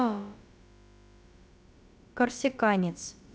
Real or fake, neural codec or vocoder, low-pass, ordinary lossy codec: fake; codec, 16 kHz, about 1 kbps, DyCAST, with the encoder's durations; none; none